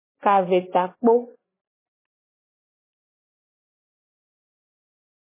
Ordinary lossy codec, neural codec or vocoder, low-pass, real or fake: MP3, 16 kbps; vocoder, 44.1 kHz, 128 mel bands every 512 samples, BigVGAN v2; 3.6 kHz; fake